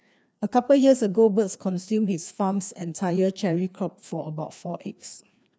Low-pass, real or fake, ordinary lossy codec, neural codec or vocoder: none; fake; none; codec, 16 kHz, 2 kbps, FreqCodec, larger model